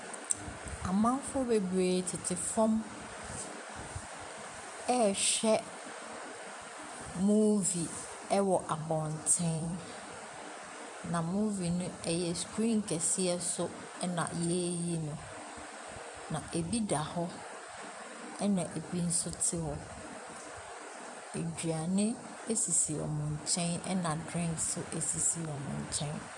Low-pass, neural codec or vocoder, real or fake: 10.8 kHz; vocoder, 44.1 kHz, 128 mel bands every 256 samples, BigVGAN v2; fake